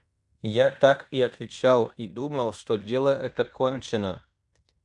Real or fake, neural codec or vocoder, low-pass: fake; codec, 16 kHz in and 24 kHz out, 0.9 kbps, LongCat-Audio-Codec, fine tuned four codebook decoder; 10.8 kHz